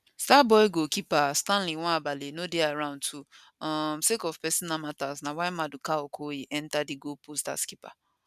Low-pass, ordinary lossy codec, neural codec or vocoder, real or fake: 14.4 kHz; none; none; real